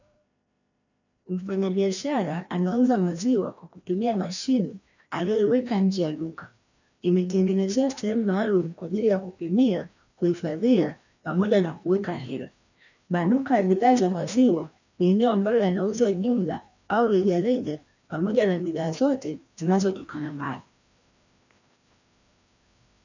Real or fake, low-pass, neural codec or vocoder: fake; 7.2 kHz; codec, 16 kHz, 1 kbps, FreqCodec, larger model